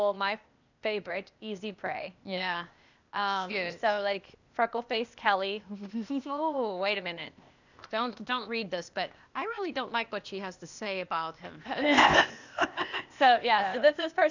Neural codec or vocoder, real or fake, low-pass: codec, 16 kHz, 0.8 kbps, ZipCodec; fake; 7.2 kHz